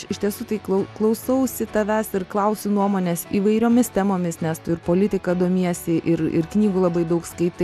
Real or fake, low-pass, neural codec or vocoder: real; 14.4 kHz; none